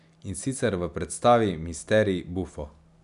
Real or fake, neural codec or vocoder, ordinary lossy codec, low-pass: real; none; none; 10.8 kHz